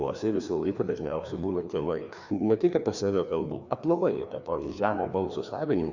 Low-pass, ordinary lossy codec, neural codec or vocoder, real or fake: 7.2 kHz; Opus, 64 kbps; codec, 16 kHz, 2 kbps, FreqCodec, larger model; fake